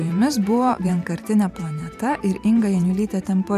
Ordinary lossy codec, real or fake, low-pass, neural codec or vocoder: AAC, 96 kbps; fake; 14.4 kHz; vocoder, 44.1 kHz, 128 mel bands every 512 samples, BigVGAN v2